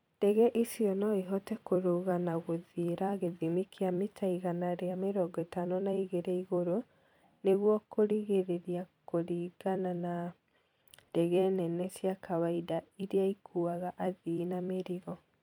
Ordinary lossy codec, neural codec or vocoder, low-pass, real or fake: MP3, 96 kbps; vocoder, 44.1 kHz, 128 mel bands every 256 samples, BigVGAN v2; 19.8 kHz; fake